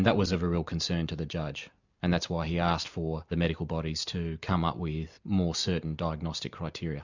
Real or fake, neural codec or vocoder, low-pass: real; none; 7.2 kHz